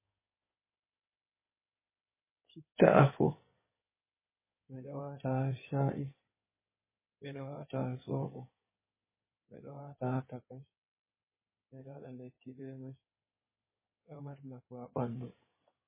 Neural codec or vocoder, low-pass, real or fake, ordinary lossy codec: codec, 16 kHz in and 24 kHz out, 2.2 kbps, FireRedTTS-2 codec; 3.6 kHz; fake; MP3, 16 kbps